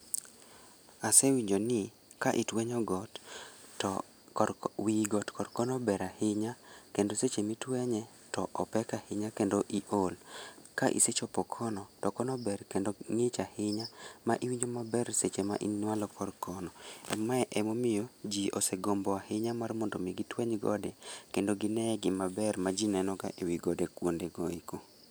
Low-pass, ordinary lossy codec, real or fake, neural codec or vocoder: none; none; real; none